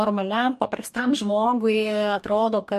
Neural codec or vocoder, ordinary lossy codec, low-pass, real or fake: codec, 44.1 kHz, 2.6 kbps, DAC; MP3, 64 kbps; 14.4 kHz; fake